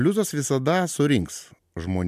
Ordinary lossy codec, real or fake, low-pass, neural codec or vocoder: MP3, 96 kbps; real; 14.4 kHz; none